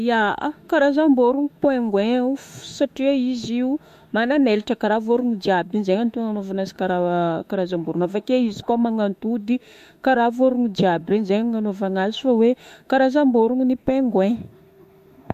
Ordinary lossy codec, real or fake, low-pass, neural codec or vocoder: MP3, 64 kbps; fake; 14.4 kHz; autoencoder, 48 kHz, 32 numbers a frame, DAC-VAE, trained on Japanese speech